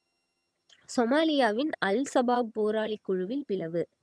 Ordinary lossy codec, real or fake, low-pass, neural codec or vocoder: none; fake; none; vocoder, 22.05 kHz, 80 mel bands, HiFi-GAN